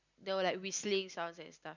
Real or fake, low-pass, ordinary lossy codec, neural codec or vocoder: real; 7.2 kHz; none; none